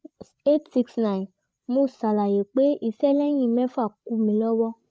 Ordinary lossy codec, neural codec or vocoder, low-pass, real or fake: none; codec, 16 kHz, 8 kbps, FreqCodec, larger model; none; fake